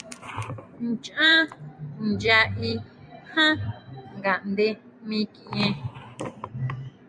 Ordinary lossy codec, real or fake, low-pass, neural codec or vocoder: MP3, 96 kbps; fake; 9.9 kHz; vocoder, 44.1 kHz, 128 mel bands every 256 samples, BigVGAN v2